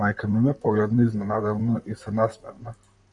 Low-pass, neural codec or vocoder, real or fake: 10.8 kHz; vocoder, 44.1 kHz, 128 mel bands, Pupu-Vocoder; fake